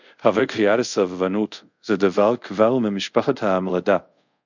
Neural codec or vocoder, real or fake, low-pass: codec, 24 kHz, 0.5 kbps, DualCodec; fake; 7.2 kHz